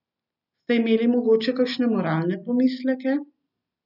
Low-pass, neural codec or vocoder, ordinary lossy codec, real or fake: 5.4 kHz; none; none; real